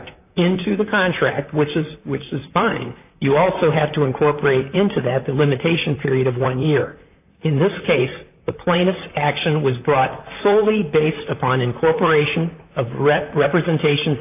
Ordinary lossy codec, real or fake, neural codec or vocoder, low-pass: AAC, 32 kbps; fake; vocoder, 44.1 kHz, 128 mel bands, Pupu-Vocoder; 3.6 kHz